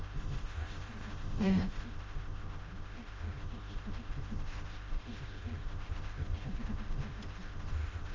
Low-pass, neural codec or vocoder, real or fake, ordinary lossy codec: 7.2 kHz; codec, 16 kHz, 0.5 kbps, FreqCodec, smaller model; fake; Opus, 32 kbps